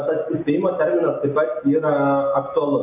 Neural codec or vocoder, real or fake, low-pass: none; real; 3.6 kHz